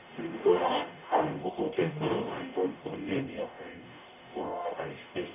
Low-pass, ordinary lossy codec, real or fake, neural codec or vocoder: 3.6 kHz; none; fake; codec, 44.1 kHz, 0.9 kbps, DAC